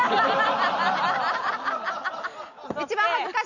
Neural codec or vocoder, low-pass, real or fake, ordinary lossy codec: none; 7.2 kHz; real; none